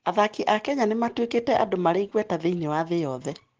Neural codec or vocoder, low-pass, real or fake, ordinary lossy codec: none; 7.2 kHz; real; Opus, 16 kbps